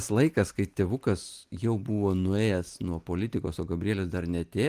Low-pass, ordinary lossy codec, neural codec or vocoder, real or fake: 14.4 kHz; Opus, 24 kbps; none; real